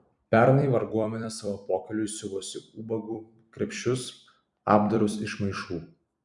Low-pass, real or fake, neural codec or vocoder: 10.8 kHz; fake; vocoder, 44.1 kHz, 128 mel bands every 256 samples, BigVGAN v2